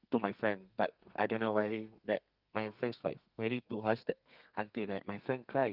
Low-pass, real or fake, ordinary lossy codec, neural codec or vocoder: 5.4 kHz; fake; Opus, 24 kbps; codec, 32 kHz, 1.9 kbps, SNAC